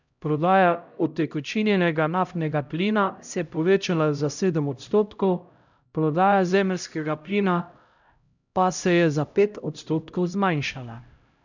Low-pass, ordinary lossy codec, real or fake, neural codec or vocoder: 7.2 kHz; none; fake; codec, 16 kHz, 0.5 kbps, X-Codec, HuBERT features, trained on LibriSpeech